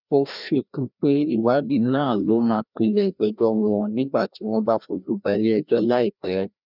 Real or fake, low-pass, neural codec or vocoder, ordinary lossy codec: fake; 5.4 kHz; codec, 16 kHz, 1 kbps, FreqCodec, larger model; none